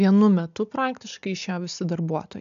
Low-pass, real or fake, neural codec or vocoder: 7.2 kHz; real; none